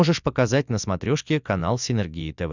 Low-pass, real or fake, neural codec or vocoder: 7.2 kHz; real; none